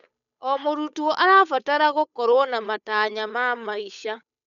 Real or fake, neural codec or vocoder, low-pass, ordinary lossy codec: fake; codec, 16 kHz, 8 kbps, FunCodec, trained on Chinese and English, 25 frames a second; 7.2 kHz; none